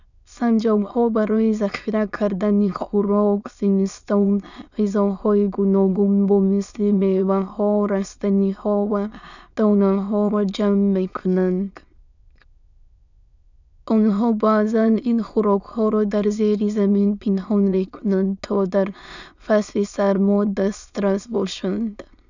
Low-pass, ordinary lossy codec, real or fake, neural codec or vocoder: 7.2 kHz; none; fake; autoencoder, 22.05 kHz, a latent of 192 numbers a frame, VITS, trained on many speakers